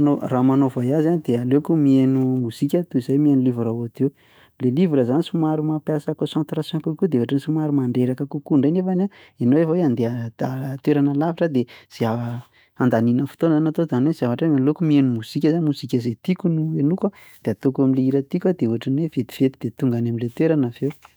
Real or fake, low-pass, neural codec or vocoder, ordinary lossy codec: fake; none; autoencoder, 48 kHz, 128 numbers a frame, DAC-VAE, trained on Japanese speech; none